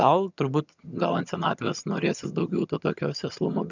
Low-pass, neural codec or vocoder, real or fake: 7.2 kHz; vocoder, 22.05 kHz, 80 mel bands, HiFi-GAN; fake